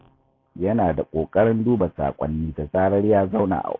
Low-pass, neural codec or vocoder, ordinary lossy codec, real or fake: 7.2 kHz; none; AAC, 32 kbps; real